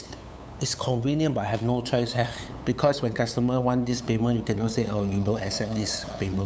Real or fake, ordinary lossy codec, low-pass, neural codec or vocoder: fake; none; none; codec, 16 kHz, 8 kbps, FunCodec, trained on LibriTTS, 25 frames a second